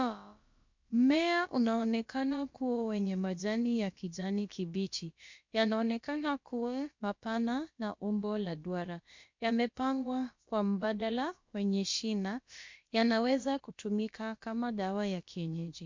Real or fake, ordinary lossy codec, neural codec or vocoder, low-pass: fake; MP3, 48 kbps; codec, 16 kHz, about 1 kbps, DyCAST, with the encoder's durations; 7.2 kHz